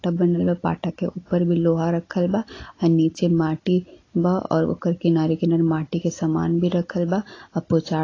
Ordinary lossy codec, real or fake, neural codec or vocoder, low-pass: AAC, 32 kbps; real; none; 7.2 kHz